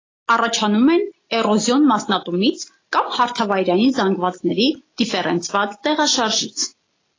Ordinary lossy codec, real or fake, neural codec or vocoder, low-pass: AAC, 32 kbps; real; none; 7.2 kHz